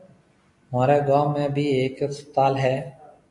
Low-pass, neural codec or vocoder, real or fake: 10.8 kHz; none; real